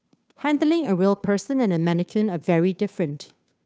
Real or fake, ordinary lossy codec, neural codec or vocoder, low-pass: fake; none; codec, 16 kHz, 2 kbps, FunCodec, trained on Chinese and English, 25 frames a second; none